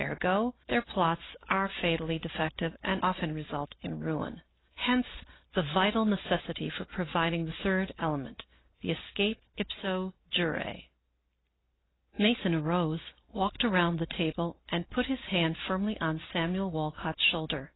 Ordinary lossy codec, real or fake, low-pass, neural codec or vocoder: AAC, 16 kbps; real; 7.2 kHz; none